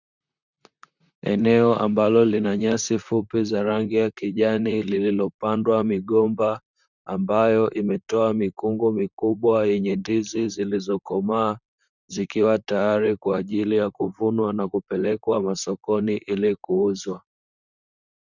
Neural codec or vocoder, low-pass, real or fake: vocoder, 44.1 kHz, 128 mel bands, Pupu-Vocoder; 7.2 kHz; fake